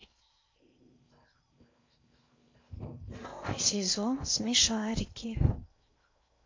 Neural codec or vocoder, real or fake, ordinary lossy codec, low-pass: codec, 16 kHz in and 24 kHz out, 0.6 kbps, FocalCodec, streaming, 4096 codes; fake; MP3, 48 kbps; 7.2 kHz